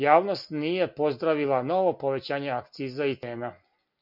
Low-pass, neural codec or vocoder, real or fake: 5.4 kHz; none; real